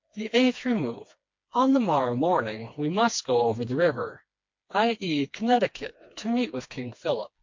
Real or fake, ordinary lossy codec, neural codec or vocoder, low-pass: fake; MP3, 48 kbps; codec, 16 kHz, 2 kbps, FreqCodec, smaller model; 7.2 kHz